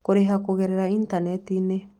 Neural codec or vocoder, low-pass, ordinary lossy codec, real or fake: none; 19.8 kHz; Opus, 24 kbps; real